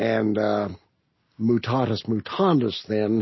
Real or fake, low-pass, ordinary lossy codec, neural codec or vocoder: real; 7.2 kHz; MP3, 24 kbps; none